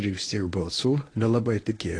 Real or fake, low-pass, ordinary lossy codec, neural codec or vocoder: fake; 9.9 kHz; AAC, 32 kbps; codec, 24 kHz, 0.9 kbps, WavTokenizer, medium speech release version 1